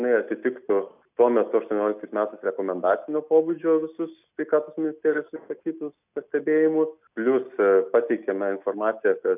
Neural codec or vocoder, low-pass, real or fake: none; 3.6 kHz; real